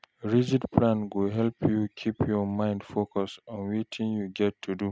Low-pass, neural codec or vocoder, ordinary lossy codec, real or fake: none; none; none; real